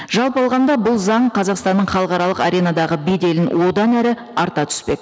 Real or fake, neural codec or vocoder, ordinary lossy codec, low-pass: real; none; none; none